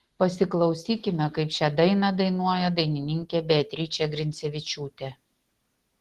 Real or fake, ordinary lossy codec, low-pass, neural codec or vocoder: real; Opus, 16 kbps; 14.4 kHz; none